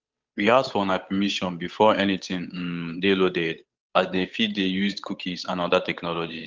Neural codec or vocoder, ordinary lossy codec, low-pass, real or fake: codec, 16 kHz, 8 kbps, FunCodec, trained on Chinese and English, 25 frames a second; Opus, 24 kbps; 7.2 kHz; fake